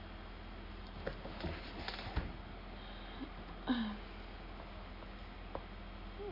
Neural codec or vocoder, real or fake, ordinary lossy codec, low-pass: none; real; none; 5.4 kHz